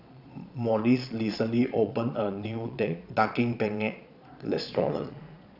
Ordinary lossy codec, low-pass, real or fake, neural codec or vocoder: none; 5.4 kHz; fake; vocoder, 22.05 kHz, 80 mel bands, Vocos